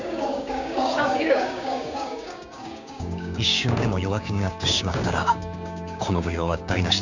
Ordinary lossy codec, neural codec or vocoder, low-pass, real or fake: none; codec, 16 kHz, 6 kbps, DAC; 7.2 kHz; fake